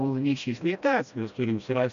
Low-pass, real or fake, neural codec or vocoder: 7.2 kHz; fake; codec, 16 kHz, 1 kbps, FreqCodec, smaller model